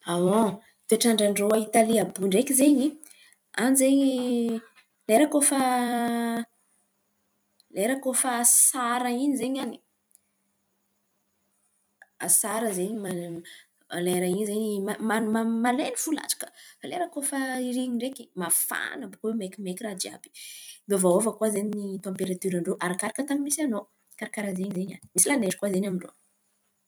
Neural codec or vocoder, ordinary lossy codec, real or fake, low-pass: vocoder, 44.1 kHz, 128 mel bands every 256 samples, BigVGAN v2; none; fake; none